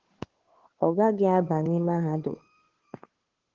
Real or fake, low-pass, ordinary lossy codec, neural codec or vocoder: fake; 7.2 kHz; Opus, 16 kbps; codec, 16 kHz, 2 kbps, FunCodec, trained on Chinese and English, 25 frames a second